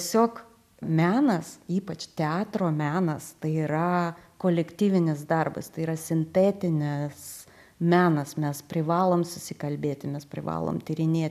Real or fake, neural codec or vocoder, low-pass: real; none; 14.4 kHz